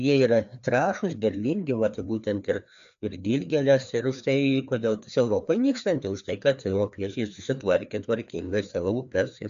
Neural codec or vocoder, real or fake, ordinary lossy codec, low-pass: codec, 16 kHz, 2 kbps, FreqCodec, larger model; fake; MP3, 64 kbps; 7.2 kHz